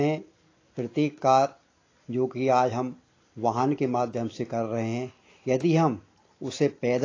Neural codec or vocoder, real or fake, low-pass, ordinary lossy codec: none; real; 7.2 kHz; AAC, 32 kbps